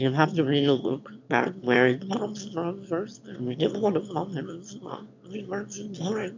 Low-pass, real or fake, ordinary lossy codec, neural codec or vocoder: 7.2 kHz; fake; MP3, 64 kbps; autoencoder, 22.05 kHz, a latent of 192 numbers a frame, VITS, trained on one speaker